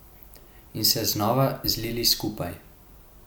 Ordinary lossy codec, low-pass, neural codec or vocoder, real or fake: none; none; none; real